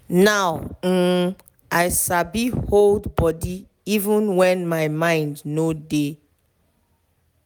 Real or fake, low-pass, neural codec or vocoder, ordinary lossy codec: real; none; none; none